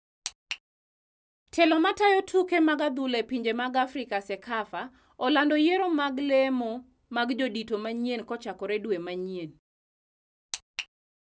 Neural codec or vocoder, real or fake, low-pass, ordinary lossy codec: none; real; none; none